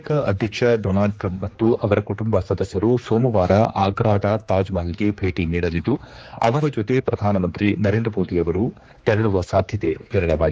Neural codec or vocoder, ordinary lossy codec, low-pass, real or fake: codec, 16 kHz, 2 kbps, X-Codec, HuBERT features, trained on general audio; none; none; fake